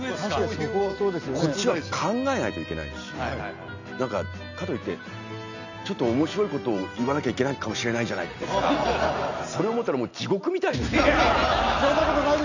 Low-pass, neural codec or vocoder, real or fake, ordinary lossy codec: 7.2 kHz; none; real; none